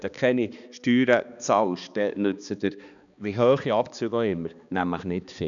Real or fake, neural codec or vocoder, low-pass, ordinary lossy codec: fake; codec, 16 kHz, 2 kbps, X-Codec, HuBERT features, trained on balanced general audio; 7.2 kHz; none